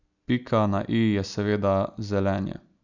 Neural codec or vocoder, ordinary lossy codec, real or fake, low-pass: none; none; real; 7.2 kHz